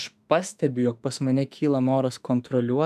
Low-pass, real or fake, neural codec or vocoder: 14.4 kHz; fake; autoencoder, 48 kHz, 32 numbers a frame, DAC-VAE, trained on Japanese speech